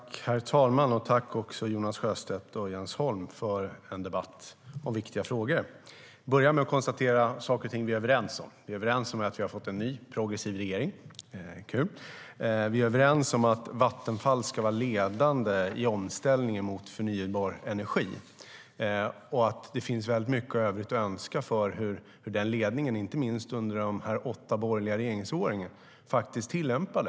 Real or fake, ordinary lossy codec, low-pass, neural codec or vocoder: real; none; none; none